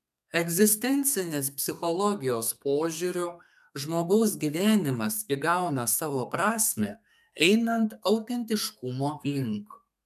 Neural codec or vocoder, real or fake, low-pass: codec, 32 kHz, 1.9 kbps, SNAC; fake; 14.4 kHz